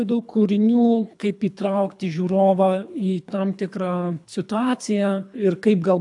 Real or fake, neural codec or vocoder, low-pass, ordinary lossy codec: fake; codec, 24 kHz, 3 kbps, HILCodec; 10.8 kHz; AAC, 64 kbps